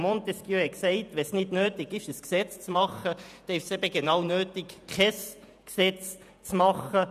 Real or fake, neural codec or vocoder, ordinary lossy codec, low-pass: fake; vocoder, 48 kHz, 128 mel bands, Vocos; none; 14.4 kHz